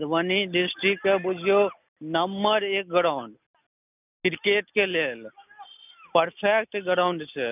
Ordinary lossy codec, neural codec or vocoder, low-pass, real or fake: none; none; 3.6 kHz; real